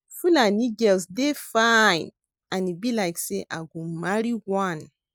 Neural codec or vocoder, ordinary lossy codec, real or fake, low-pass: none; none; real; none